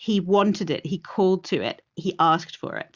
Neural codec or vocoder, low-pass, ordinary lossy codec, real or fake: none; 7.2 kHz; Opus, 64 kbps; real